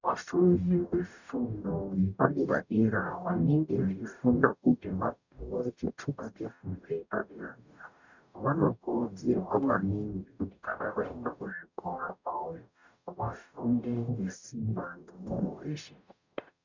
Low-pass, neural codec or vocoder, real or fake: 7.2 kHz; codec, 44.1 kHz, 0.9 kbps, DAC; fake